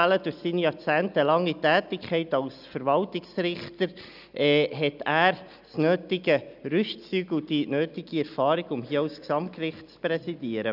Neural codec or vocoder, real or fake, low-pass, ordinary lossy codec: none; real; 5.4 kHz; none